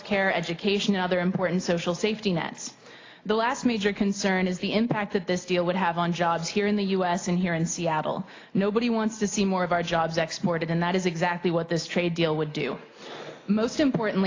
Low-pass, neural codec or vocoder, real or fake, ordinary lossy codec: 7.2 kHz; none; real; AAC, 32 kbps